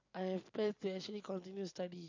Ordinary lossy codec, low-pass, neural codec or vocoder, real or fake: AAC, 48 kbps; 7.2 kHz; none; real